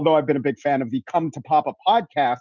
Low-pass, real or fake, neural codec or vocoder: 7.2 kHz; real; none